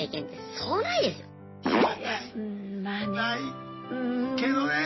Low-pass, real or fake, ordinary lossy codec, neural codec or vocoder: 7.2 kHz; real; MP3, 24 kbps; none